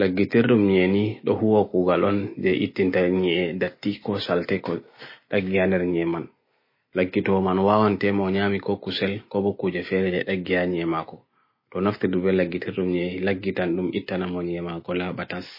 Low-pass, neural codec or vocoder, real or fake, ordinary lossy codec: 5.4 kHz; none; real; MP3, 24 kbps